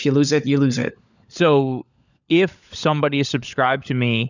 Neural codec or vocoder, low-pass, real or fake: codec, 16 kHz, 16 kbps, FunCodec, trained on LibriTTS, 50 frames a second; 7.2 kHz; fake